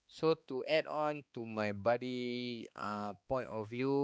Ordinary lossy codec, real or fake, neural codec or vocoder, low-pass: none; fake; codec, 16 kHz, 2 kbps, X-Codec, HuBERT features, trained on balanced general audio; none